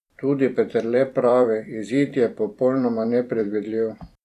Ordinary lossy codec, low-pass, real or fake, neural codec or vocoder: none; 14.4 kHz; fake; vocoder, 44.1 kHz, 128 mel bands every 256 samples, BigVGAN v2